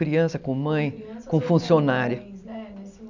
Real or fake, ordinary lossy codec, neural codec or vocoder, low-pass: real; none; none; 7.2 kHz